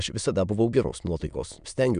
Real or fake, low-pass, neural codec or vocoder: fake; 9.9 kHz; autoencoder, 22.05 kHz, a latent of 192 numbers a frame, VITS, trained on many speakers